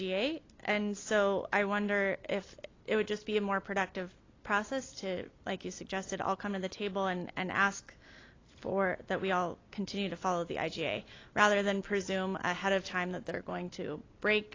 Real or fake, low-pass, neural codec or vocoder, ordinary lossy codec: real; 7.2 kHz; none; AAC, 32 kbps